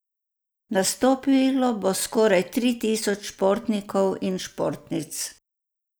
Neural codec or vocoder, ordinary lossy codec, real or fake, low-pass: none; none; real; none